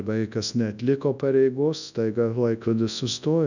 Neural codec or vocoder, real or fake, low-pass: codec, 24 kHz, 0.9 kbps, WavTokenizer, large speech release; fake; 7.2 kHz